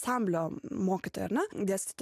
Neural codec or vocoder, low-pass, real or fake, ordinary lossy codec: none; 14.4 kHz; real; AAC, 96 kbps